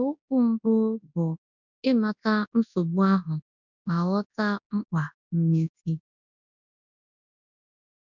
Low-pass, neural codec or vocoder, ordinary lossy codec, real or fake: 7.2 kHz; codec, 24 kHz, 0.9 kbps, WavTokenizer, large speech release; none; fake